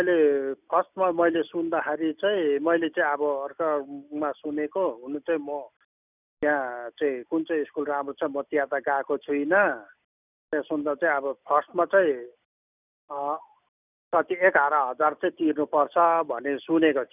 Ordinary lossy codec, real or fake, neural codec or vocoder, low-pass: none; real; none; 3.6 kHz